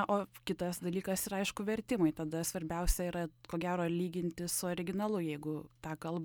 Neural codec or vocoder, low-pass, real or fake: none; 19.8 kHz; real